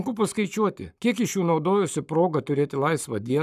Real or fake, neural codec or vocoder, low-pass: fake; codec, 44.1 kHz, 7.8 kbps, Pupu-Codec; 14.4 kHz